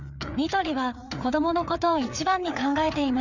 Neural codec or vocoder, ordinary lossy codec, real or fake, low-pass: codec, 16 kHz, 4 kbps, FreqCodec, larger model; none; fake; 7.2 kHz